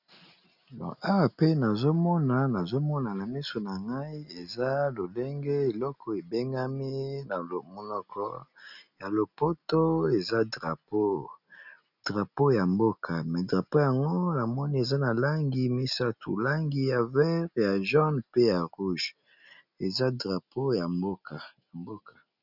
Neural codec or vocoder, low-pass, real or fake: none; 5.4 kHz; real